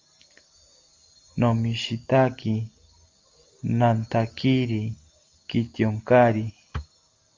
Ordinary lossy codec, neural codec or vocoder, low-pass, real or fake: Opus, 32 kbps; none; 7.2 kHz; real